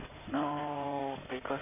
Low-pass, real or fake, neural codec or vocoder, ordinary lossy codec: 3.6 kHz; fake; codec, 16 kHz in and 24 kHz out, 2.2 kbps, FireRedTTS-2 codec; none